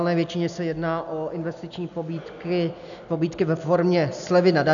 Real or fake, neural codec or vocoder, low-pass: real; none; 7.2 kHz